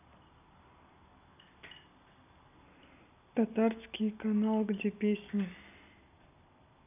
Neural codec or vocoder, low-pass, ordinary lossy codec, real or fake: none; 3.6 kHz; none; real